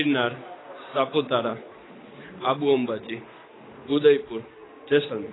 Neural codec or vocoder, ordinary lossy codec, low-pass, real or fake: none; AAC, 16 kbps; 7.2 kHz; real